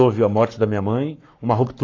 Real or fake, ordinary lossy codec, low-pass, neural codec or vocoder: fake; AAC, 32 kbps; 7.2 kHz; codec, 44.1 kHz, 7.8 kbps, Pupu-Codec